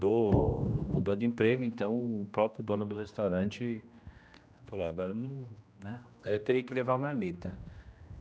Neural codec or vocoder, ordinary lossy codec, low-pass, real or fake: codec, 16 kHz, 1 kbps, X-Codec, HuBERT features, trained on general audio; none; none; fake